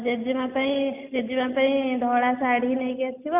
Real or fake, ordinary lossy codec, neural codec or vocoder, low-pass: real; none; none; 3.6 kHz